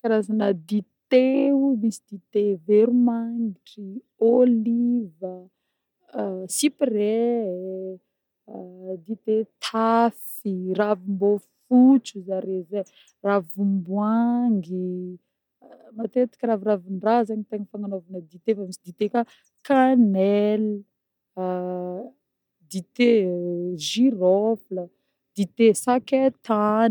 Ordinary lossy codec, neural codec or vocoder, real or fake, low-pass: none; none; real; 19.8 kHz